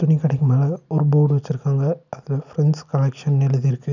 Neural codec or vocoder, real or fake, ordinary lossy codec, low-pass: none; real; none; 7.2 kHz